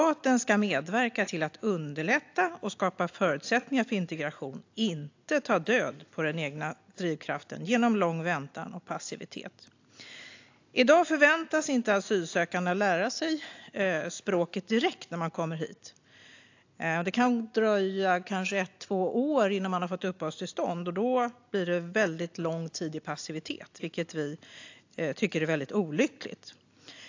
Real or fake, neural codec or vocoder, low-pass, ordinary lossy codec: real; none; 7.2 kHz; AAC, 48 kbps